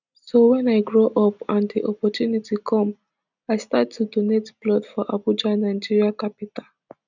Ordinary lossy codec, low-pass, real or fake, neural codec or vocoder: none; 7.2 kHz; real; none